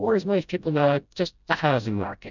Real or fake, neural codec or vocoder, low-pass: fake; codec, 16 kHz, 0.5 kbps, FreqCodec, smaller model; 7.2 kHz